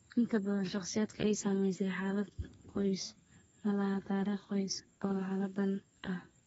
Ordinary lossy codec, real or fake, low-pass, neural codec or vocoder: AAC, 24 kbps; fake; 14.4 kHz; codec, 32 kHz, 1.9 kbps, SNAC